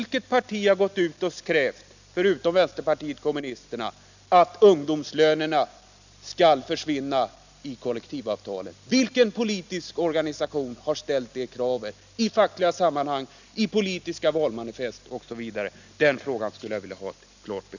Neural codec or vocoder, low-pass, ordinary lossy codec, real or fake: none; 7.2 kHz; none; real